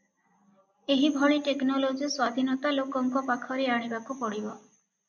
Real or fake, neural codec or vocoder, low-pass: real; none; 7.2 kHz